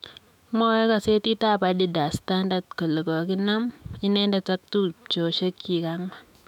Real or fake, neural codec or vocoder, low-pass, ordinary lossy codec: fake; autoencoder, 48 kHz, 128 numbers a frame, DAC-VAE, trained on Japanese speech; 19.8 kHz; none